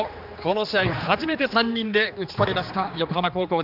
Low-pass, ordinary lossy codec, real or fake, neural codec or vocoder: 5.4 kHz; none; fake; codec, 16 kHz, 2 kbps, X-Codec, HuBERT features, trained on general audio